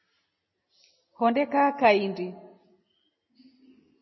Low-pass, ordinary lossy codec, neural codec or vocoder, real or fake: 7.2 kHz; MP3, 24 kbps; none; real